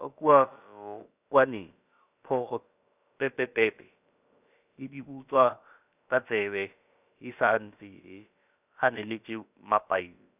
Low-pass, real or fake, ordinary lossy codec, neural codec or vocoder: 3.6 kHz; fake; none; codec, 16 kHz, about 1 kbps, DyCAST, with the encoder's durations